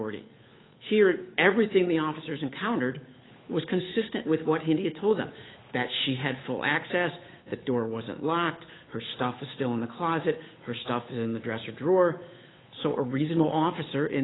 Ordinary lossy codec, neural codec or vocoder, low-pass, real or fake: AAC, 16 kbps; codec, 16 kHz, 8 kbps, FunCodec, trained on Chinese and English, 25 frames a second; 7.2 kHz; fake